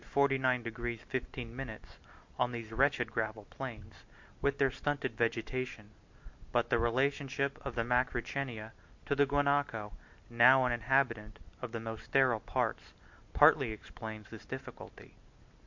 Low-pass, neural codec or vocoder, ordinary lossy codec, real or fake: 7.2 kHz; none; MP3, 48 kbps; real